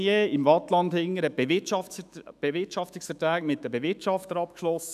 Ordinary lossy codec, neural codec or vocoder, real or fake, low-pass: none; autoencoder, 48 kHz, 128 numbers a frame, DAC-VAE, trained on Japanese speech; fake; 14.4 kHz